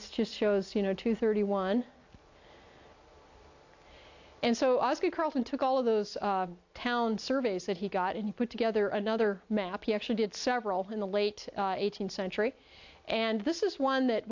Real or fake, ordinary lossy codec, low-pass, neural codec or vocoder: real; AAC, 48 kbps; 7.2 kHz; none